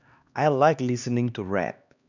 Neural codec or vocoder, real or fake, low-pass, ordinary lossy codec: codec, 16 kHz, 2 kbps, X-Codec, HuBERT features, trained on LibriSpeech; fake; 7.2 kHz; none